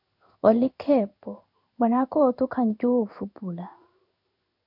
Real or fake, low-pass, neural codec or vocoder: fake; 5.4 kHz; codec, 16 kHz in and 24 kHz out, 1 kbps, XY-Tokenizer